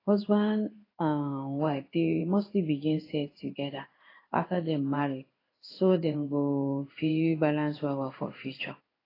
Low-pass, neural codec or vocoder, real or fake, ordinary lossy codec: 5.4 kHz; codec, 16 kHz in and 24 kHz out, 1 kbps, XY-Tokenizer; fake; AAC, 24 kbps